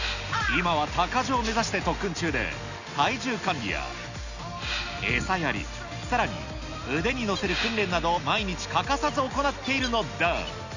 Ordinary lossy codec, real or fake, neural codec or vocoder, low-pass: none; real; none; 7.2 kHz